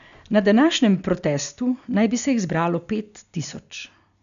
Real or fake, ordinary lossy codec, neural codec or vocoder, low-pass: real; none; none; 7.2 kHz